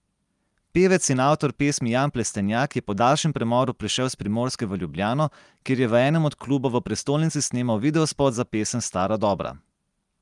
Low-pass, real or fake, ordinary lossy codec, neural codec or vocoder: 10.8 kHz; real; Opus, 32 kbps; none